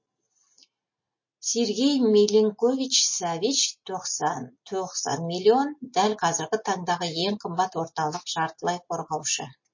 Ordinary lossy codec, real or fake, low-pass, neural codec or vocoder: MP3, 32 kbps; real; 7.2 kHz; none